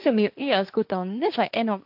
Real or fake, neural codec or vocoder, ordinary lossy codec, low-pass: fake; codec, 16 kHz, 1.1 kbps, Voila-Tokenizer; AAC, 48 kbps; 5.4 kHz